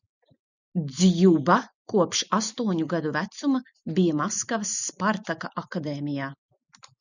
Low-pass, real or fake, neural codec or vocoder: 7.2 kHz; real; none